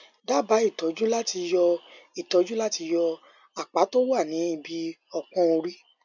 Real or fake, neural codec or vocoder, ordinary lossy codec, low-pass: real; none; none; 7.2 kHz